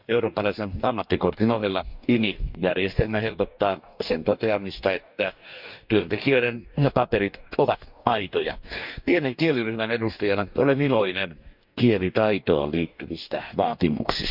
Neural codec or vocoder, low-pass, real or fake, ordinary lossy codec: codec, 44.1 kHz, 2.6 kbps, DAC; 5.4 kHz; fake; none